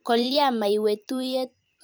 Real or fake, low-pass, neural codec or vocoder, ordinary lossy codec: fake; none; vocoder, 44.1 kHz, 128 mel bands every 512 samples, BigVGAN v2; none